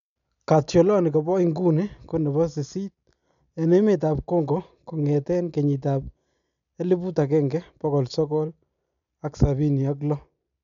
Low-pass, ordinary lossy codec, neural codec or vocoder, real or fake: 7.2 kHz; none; none; real